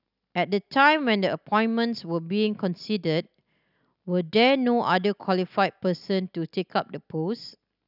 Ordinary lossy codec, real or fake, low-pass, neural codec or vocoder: none; real; 5.4 kHz; none